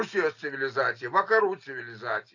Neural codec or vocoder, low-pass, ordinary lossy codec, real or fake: none; 7.2 kHz; AAC, 48 kbps; real